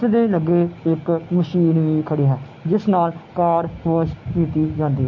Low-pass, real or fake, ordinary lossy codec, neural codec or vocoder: 7.2 kHz; real; MP3, 32 kbps; none